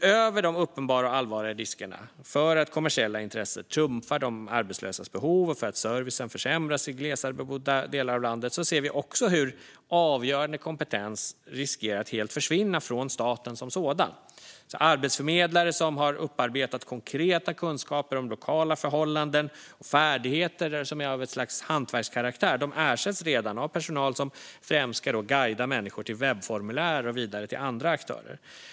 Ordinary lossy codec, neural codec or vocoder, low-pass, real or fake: none; none; none; real